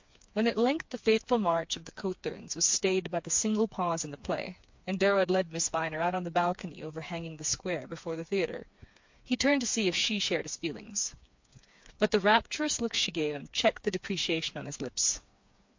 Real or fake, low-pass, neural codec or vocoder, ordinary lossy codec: fake; 7.2 kHz; codec, 16 kHz, 4 kbps, FreqCodec, smaller model; MP3, 48 kbps